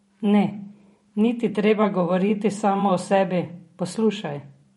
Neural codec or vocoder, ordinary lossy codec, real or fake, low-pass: vocoder, 44.1 kHz, 128 mel bands every 512 samples, BigVGAN v2; MP3, 48 kbps; fake; 19.8 kHz